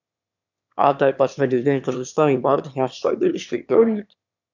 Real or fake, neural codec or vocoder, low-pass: fake; autoencoder, 22.05 kHz, a latent of 192 numbers a frame, VITS, trained on one speaker; 7.2 kHz